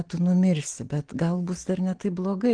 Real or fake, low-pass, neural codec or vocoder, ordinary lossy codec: real; 9.9 kHz; none; Opus, 16 kbps